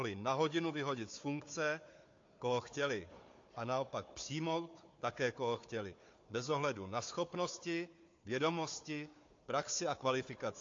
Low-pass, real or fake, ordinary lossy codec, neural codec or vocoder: 7.2 kHz; fake; AAC, 48 kbps; codec, 16 kHz, 4 kbps, FunCodec, trained on Chinese and English, 50 frames a second